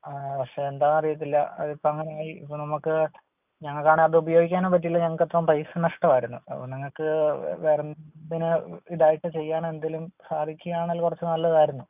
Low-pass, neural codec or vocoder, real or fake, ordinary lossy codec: 3.6 kHz; none; real; none